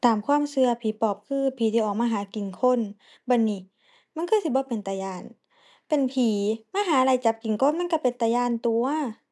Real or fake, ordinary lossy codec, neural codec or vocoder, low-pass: real; none; none; none